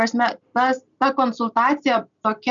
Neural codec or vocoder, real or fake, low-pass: none; real; 7.2 kHz